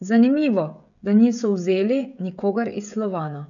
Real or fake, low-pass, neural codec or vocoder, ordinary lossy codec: fake; 7.2 kHz; codec, 16 kHz, 16 kbps, FreqCodec, smaller model; none